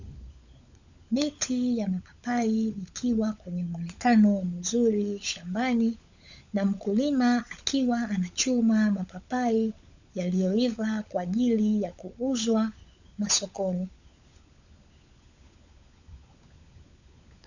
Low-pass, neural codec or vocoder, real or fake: 7.2 kHz; codec, 16 kHz, 16 kbps, FunCodec, trained on LibriTTS, 50 frames a second; fake